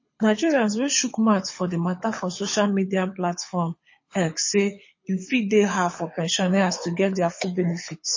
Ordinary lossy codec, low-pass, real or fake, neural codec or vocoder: MP3, 32 kbps; 7.2 kHz; fake; codec, 24 kHz, 6 kbps, HILCodec